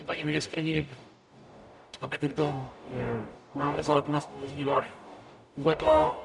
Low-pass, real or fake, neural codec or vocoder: 10.8 kHz; fake; codec, 44.1 kHz, 0.9 kbps, DAC